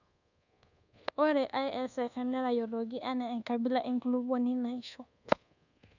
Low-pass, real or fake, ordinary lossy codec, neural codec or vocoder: 7.2 kHz; fake; none; codec, 24 kHz, 1.2 kbps, DualCodec